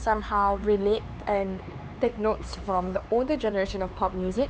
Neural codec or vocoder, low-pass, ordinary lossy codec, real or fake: codec, 16 kHz, 4 kbps, X-Codec, HuBERT features, trained on LibriSpeech; none; none; fake